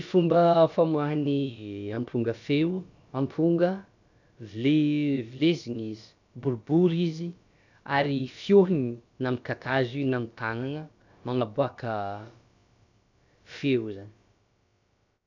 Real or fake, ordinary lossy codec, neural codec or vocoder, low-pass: fake; none; codec, 16 kHz, about 1 kbps, DyCAST, with the encoder's durations; 7.2 kHz